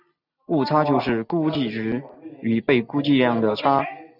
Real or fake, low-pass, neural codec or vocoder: real; 5.4 kHz; none